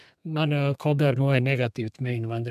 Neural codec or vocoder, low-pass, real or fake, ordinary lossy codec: codec, 32 kHz, 1.9 kbps, SNAC; 14.4 kHz; fake; MP3, 96 kbps